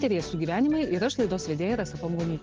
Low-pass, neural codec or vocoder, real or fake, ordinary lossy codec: 7.2 kHz; none; real; Opus, 16 kbps